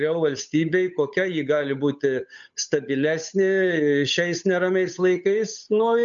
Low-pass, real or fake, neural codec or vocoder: 7.2 kHz; fake; codec, 16 kHz, 8 kbps, FunCodec, trained on Chinese and English, 25 frames a second